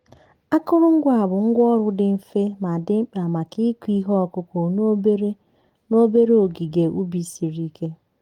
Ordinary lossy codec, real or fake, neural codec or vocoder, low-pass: Opus, 24 kbps; real; none; 19.8 kHz